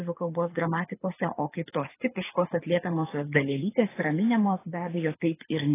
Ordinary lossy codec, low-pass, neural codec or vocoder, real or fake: AAC, 16 kbps; 3.6 kHz; codec, 24 kHz, 6 kbps, HILCodec; fake